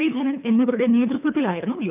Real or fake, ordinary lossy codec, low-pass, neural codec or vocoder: fake; none; 3.6 kHz; codec, 24 kHz, 3 kbps, HILCodec